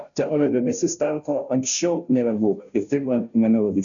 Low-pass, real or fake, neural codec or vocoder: 7.2 kHz; fake; codec, 16 kHz, 0.5 kbps, FunCodec, trained on Chinese and English, 25 frames a second